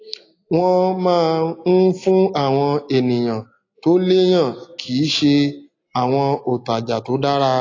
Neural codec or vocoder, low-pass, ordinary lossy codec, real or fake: none; 7.2 kHz; AAC, 32 kbps; real